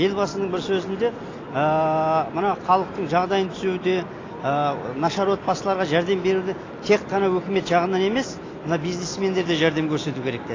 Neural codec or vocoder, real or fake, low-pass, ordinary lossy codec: none; real; 7.2 kHz; AAC, 32 kbps